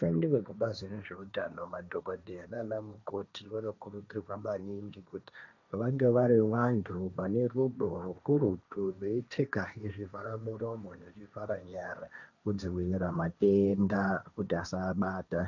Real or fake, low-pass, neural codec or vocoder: fake; 7.2 kHz; codec, 16 kHz, 1.1 kbps, Voila-Tokenizer